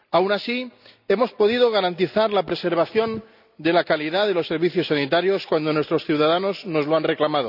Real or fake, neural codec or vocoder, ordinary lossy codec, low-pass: real; none; none; 5.4 kHz